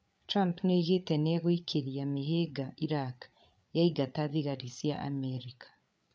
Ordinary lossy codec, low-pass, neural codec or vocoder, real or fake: none; none; codec, 16 kHz, 8 kbps, FreqCodec, larger model; fake